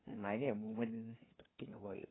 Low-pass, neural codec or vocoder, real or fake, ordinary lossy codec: 7.2 kHz; codec, 16 kHz, 2 kbps, FunCodec, trained on LibriTTS, 25 frames a second; fake; AAC, 16 kbps